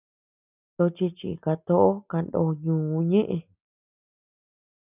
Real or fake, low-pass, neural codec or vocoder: real; 3.6 kHz; none